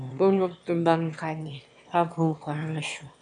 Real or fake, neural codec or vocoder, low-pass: fake; autoencoder, 22.05 kHz, a latent of 192 numbers a frame, VITS, trained on one speaker; 9.9 kHz